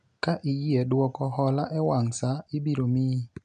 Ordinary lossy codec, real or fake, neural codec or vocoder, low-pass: MP3, 96 kbps; real; none; 9.9 kHz